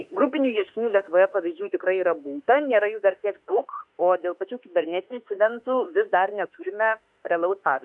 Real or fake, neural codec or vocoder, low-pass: fake; autoencoder, 48 kHz, 32 numbers a frame, DAC-VAE, trained on Japanese speech; 10.8 kHz